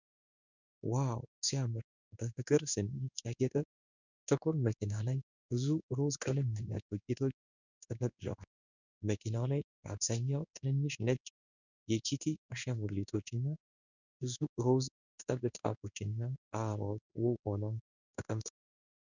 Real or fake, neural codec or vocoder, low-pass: fake; codec, 16 kHz in and 24 kHz out, 1 kbps, XY-Tokenizer; 7.2 kHz